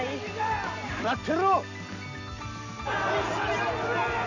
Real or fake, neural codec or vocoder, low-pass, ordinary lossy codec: real; none; 7.2 kHz; none